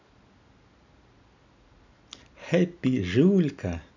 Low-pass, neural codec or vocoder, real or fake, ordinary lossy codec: 7.2 kHz; none; real; none